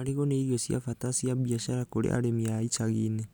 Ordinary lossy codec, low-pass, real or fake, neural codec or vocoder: none; none; real; none